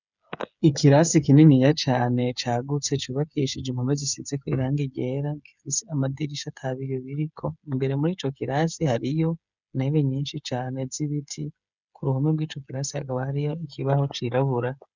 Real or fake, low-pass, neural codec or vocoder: fake; 7.2 kHz; codec, 16 kHz, 8 kbps, FreqCodec, smaller model